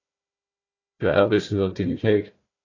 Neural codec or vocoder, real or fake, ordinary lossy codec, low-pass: codec, 16 kHz, 1 kbps, FunCodec, trained on Chinese and English, 50 frames a second; fake; AAC, 32 kbps; 7.2 kHz